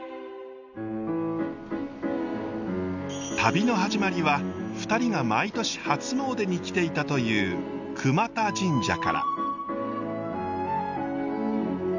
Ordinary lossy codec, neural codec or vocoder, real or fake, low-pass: none; none; real; 7.2 kHz